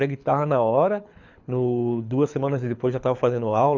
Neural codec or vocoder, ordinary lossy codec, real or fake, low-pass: codec, 24 kHz, 6 kbps, HILCodec; none; fake; 7.2 kHz